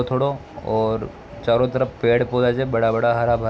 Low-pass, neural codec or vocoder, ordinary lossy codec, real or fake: none; none; none; real